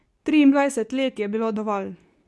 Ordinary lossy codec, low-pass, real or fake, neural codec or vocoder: none; none; fake; codec, 24 kHz, 0.9 kbps, WavTokenizer, medium speech release version 2